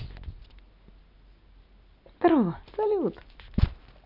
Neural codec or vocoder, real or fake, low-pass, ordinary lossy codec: none; real; 5.4 kHz; none